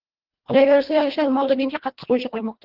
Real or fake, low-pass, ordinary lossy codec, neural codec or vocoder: fake; 5.4 kHz; Opus, 16 kbps; codec, 24 kHz, 1.5 kbps, HILCodec